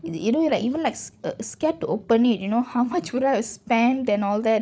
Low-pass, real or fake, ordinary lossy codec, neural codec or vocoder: none; fake; none; codec, 16 kHz, 16 kbps, FreqCodec, larger model